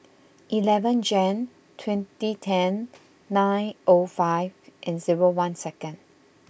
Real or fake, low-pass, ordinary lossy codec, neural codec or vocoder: real; none; none; none